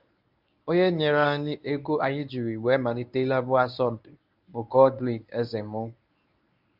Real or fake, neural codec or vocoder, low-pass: fake; codec, 24 kHz, 0.9 kbps, WavTokenizer, medium speech release version 1; 5.4 kHz